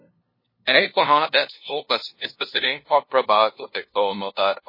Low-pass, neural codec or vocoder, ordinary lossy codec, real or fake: 5.4 kHz; codec, 16 kHz, 0.5 kbps, FunCodec, trained on LibriTTS, 25 frames a second; MP3, 24 kbps; fake